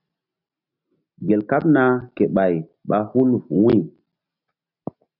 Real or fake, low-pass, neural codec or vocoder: real; 5.4 kHz; none